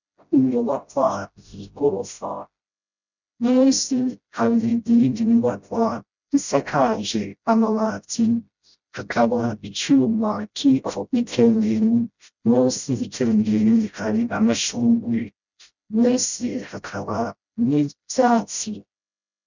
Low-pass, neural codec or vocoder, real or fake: 7.2 kHz; codec, 16 kHz, 0.5 kbps, FreqCodec, smaller model; fake